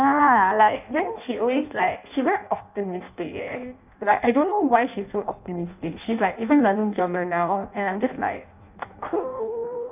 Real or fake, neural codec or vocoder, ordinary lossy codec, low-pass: fake; codec, 16 kHz in and 24 kHz out, 0.6 kbps, FireRedTTS-2 codec; none; 3.6 kHz